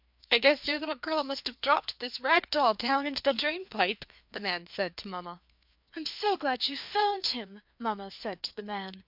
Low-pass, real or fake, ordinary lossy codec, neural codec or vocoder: 5.4 kHz; fake; MP3, 48 kbps; codec, 16 kHz, 2 kbps, FreqCodec, larger model